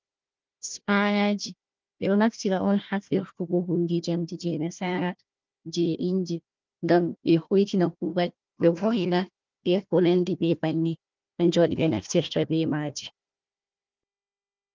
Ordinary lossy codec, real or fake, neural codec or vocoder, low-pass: Opus, 32 kbps; fake; codec, 16 kHz, 1 kbps, FunCodec, trained on Chinese and English, 50 frames a second; 7.2 kHz